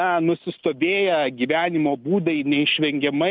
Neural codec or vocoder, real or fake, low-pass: none; real; 5.4 kHz